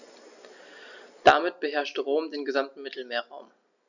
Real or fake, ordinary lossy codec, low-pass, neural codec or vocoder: real; none; 7.2 kHz; none